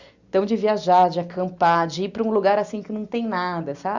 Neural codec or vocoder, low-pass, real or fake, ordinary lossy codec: none; 7.2 kHz; real; Opus, 64 kbps